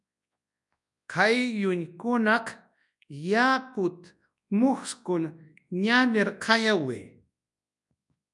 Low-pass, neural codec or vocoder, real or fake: 10.8 kHz; codec, 24 kHz, 0.9 kbps, WavTokenizer, large speech release; fake